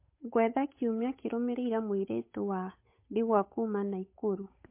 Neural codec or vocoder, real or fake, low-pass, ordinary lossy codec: codec, 16 kHz, 8 kbps, FunCodec, trained on Chinese and English, 25 frames a second; fake; 3.6 kHz; MP3, 24 kbps